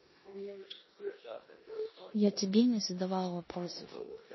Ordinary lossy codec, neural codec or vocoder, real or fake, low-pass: MP3, 24 kbps; codec, 16 kHz in and 24 kHz out, 0.9 kbps, LongCat-Audio-Codec, four codebook decoder; fake; 7.2 kHz